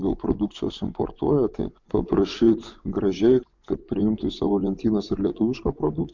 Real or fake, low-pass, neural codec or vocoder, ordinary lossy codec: fake; 7.2 kHz; vocoder, 24 kHz, 100 mel bands, Vocos; MP3, 64 kbps